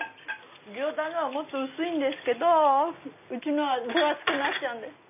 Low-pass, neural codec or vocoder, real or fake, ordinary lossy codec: 3.6 kHz; none; real; none